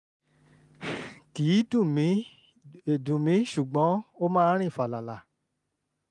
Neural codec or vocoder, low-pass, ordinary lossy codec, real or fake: none; 10.8 kHz; none; real